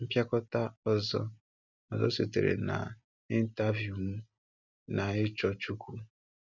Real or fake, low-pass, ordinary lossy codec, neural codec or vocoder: real; 7.2 kHz; none; none